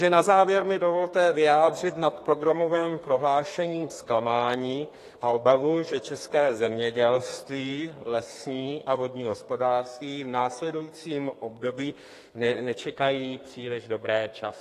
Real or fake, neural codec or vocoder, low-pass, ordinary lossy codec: fake; codec, 32 kHz, 1.9 kbps, SNAC; 14.4 kHz; AAC, 48 kbps